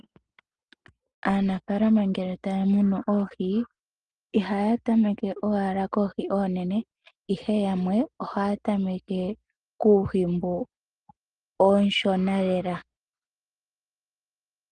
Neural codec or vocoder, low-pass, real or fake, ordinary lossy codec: none; 9.9 kHz; real; Opus, 24 kbps